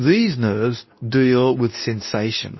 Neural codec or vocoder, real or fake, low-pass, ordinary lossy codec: codec, 24 kHz, 0.9 kbps, WavTokenizer, medium speech release version 2; fake; 7.2 kHz; MP3, 24 kbps